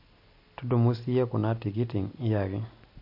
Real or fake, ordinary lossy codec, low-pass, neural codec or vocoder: real; MP3, 32 kbps; 5.4 kHz; none